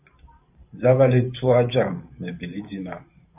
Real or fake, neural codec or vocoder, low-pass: fake; vocoder, 44.1 kHz, 128 mel bands every 512 samples, BigVGAN v2; 3.6 kHz